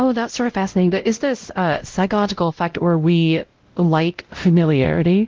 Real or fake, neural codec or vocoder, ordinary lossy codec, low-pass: fake; codec, 16 kHz, 0.5 kbps, X-Codec, WavLM features, trained on Multilingual LibriSpeech; Opus, 16 kbps; 7.2 kHz